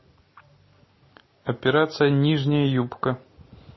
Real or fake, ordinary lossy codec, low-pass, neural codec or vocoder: real; MP3, 24 kbps; 7.2 kHz; none